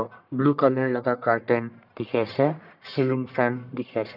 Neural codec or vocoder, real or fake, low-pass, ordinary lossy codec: codec, 44.1 kHz, 1.7 kbps, Pupu-Codec; fake; 5.4 kHz; none